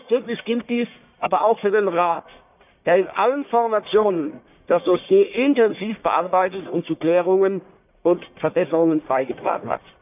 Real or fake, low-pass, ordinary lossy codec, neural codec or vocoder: fake; 3.6 kHz; none; codec, 44.1 kHz, 1.7 kbps, Pupu-Codec